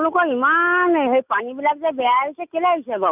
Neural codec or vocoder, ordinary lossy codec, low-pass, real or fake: none; none; 3.6 kHz; real